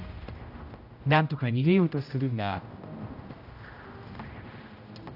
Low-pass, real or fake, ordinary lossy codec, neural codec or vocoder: 5.4 kHz; fake; AAC, 48 kbps; codec, 16 kHz, 0.5 kbps, X-Codec, HuBERT features, trained on general audio